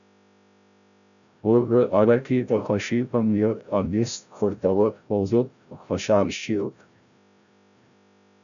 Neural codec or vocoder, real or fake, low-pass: codec, 16 kHz, 0.5 kbps, FreqCodec, larger model; fake; 7.2 kHz